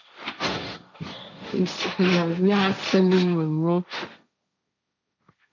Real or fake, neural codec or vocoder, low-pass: fake; codec, 16 kHz, 1.1 kbps, Voila-Tokenizer; 7.2 kHz